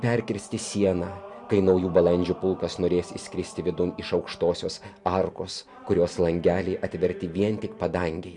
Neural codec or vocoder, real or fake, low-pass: none; real; 10.8 kHz